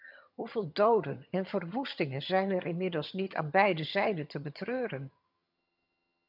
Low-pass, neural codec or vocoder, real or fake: 5.4 kHz; vocoder, 22.05 kHz, 80 mel bands, HiFi-GAN; fake